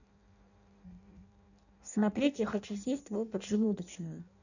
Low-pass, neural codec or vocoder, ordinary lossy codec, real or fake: 7.2 kHz; codec, 16 kHz in and 24 kHz out, 0.6 kbps, FireRedTTS-2 codec; none; fake